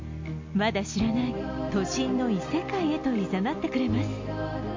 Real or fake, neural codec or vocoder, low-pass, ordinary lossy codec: real; none; 7.2 kHz; MP3, 48 kbps